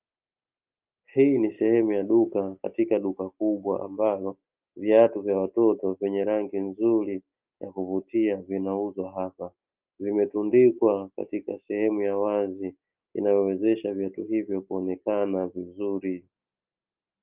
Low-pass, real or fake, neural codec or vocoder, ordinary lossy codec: 3.6 kHz; real; none; Opus, 32 kbps